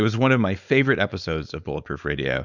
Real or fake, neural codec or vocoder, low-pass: fake; codec, 16 kHz, 4.8 kbps, FACodec; 7.2 kHz